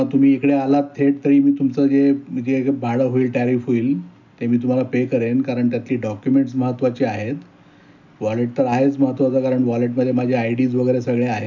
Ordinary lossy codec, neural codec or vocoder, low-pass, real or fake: none; none; 7.2 kHz; real